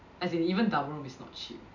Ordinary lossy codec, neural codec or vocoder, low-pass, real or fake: none; none; 7.2 kHz; real